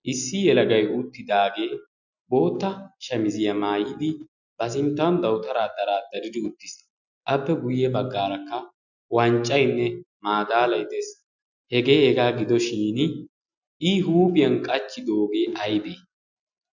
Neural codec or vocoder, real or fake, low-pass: none; real; 7.2 kHz